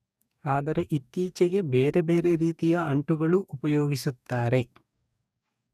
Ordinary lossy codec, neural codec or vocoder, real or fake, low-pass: none; codec, 44.1 kHz, 2.6 kbps, DAC; fake; 14.4 kHz